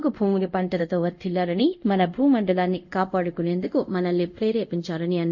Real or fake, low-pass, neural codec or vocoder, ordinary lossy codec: fake; 7.2 kHz; codec, 24 kHz, 0.5 kbps, DualCodec; none